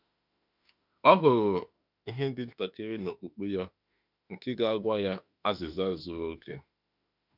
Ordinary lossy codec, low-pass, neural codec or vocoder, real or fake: none; 5.4 kHz; autoencoder, 48 kHz, 32 numbers a frame, DAC-VAE, trained on Japanese speech; fake